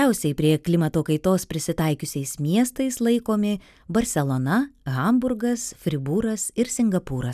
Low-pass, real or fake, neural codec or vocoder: 14.4 kHz; real; none